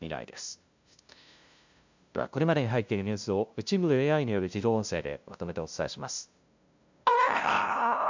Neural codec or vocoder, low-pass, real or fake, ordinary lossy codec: codec, 16 kHz, 0.5 kbps, FunCodec, trained on LibriTTS, 25 frames a second; 7.2 kHz; fake; MP3, 64 kbps